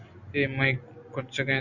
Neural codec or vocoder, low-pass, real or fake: none; 7.2 kHz; real